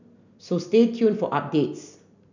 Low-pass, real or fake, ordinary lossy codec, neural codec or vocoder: 7.2 kHz; real; none; none